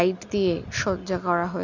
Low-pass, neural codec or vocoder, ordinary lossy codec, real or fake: 7.2 kHz; none; none; real